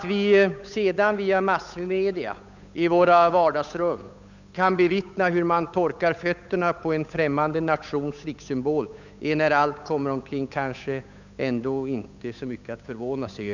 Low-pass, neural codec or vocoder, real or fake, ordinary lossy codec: 7.2 kHz; codec, 16 kHz, 8 kbps, FunCodec, trained on Chinese and English, 25 frames a second; fake; none